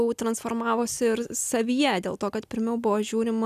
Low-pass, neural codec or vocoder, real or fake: 14.4 kHz; none; real